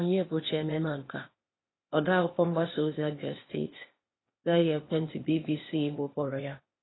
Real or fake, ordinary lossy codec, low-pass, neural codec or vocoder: fake; AAC, 16 kbps; 7.2 kHz; codec, 16 kHz, 0.8 kbps, ZipCodec